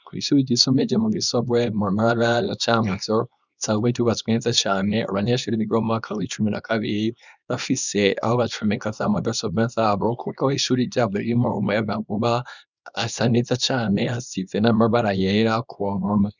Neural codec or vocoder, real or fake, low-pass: codec, 24 kHz, 0.9 kbps, WavTokenizer, small release; fake; 7.2 kHz